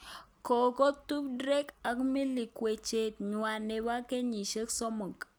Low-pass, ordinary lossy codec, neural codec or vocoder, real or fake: none; none; none; real